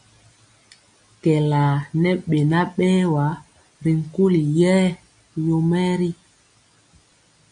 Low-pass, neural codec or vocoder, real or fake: 9.9 kHz; none; real